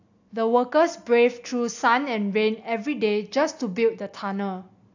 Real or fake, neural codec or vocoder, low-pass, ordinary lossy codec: real; none; 7.2 kHz; AAC, 48 kbps